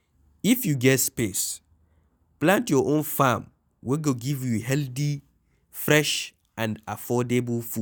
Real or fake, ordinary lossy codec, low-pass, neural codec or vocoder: real; none; none; none